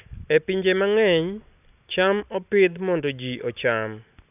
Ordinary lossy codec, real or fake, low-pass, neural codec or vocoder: none; real; 3.6 kHz; none